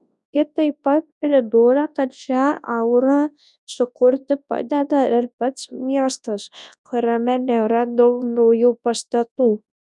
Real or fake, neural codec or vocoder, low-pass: fake; codec, 24 kHz, 0.9 kbps, WavTokenizer, large speech release; 10.8 kHz